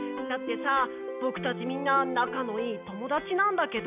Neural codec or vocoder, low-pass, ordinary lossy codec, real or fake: none; 3.6 kHz; none; real